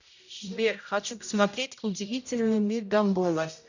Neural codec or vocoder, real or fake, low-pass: codec, 16 kHz, 0.5 kbps, X-Codec, HuBERT features, trained on general audio; fake; 7.2 kHz